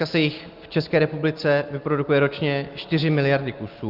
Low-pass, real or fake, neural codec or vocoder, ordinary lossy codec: 5.4 kHz; real; none; Opus, 24 kbps